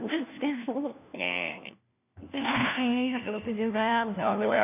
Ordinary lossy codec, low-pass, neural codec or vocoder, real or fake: none; 3.6 kHz; codec, 16 kHz, 1 kbps, FunCodec, trained on LibriTTS, 50 frames a second; fake